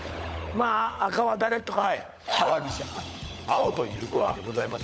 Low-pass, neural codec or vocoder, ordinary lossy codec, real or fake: none; codec, 16 kHz, 16 kbps, FunCodec, trained on LibriTTS, 50 frames a second; none; fake